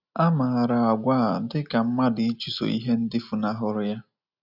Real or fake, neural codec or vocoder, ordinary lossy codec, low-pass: real; none; none; 5.4 kHz